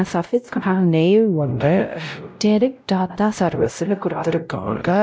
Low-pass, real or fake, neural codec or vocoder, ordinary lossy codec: none; fake; codec, 16 kHz, 0.5 kbps, X-Codec, WavLM features, trained on Multilingual LibriSpeech; none